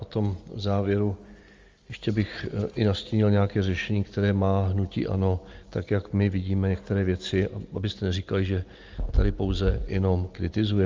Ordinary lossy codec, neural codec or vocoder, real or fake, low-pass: Opus, 32 kbps; none; real; 7.2 kHz